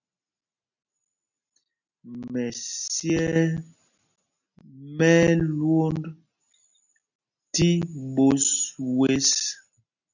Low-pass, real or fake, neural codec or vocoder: 7.2 kHz; real; none